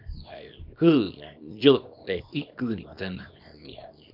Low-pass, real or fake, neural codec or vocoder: 5.4 kHz; fake; codec, 24 kHz, 0.9 kbps, WavTokenizer, small release